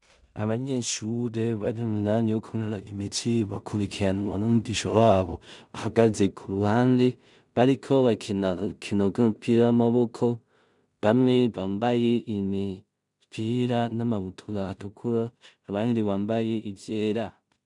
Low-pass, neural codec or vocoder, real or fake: 10.8 kHz; codec, 16 kHz in and 24 kHz out, 0.4 kbps, LongCat-Audio-Codec, two codebook decoder; fake